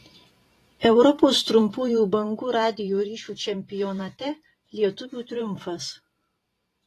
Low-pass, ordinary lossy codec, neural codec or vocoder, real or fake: 14.4 kHz; AAC, 48 kbps; vocoder, 44.1 kHz, 128 mel bands every 512 samples, BigVGAN v2; fake